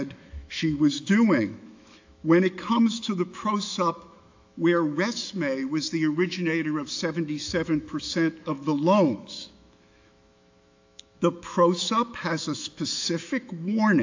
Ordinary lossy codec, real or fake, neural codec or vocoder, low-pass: AAC, 48 kbps; fake; autoencoder, 48 kHz, 128 numbers a frame, DAC-VAE, trained on Japanese speech; 7.2 kHz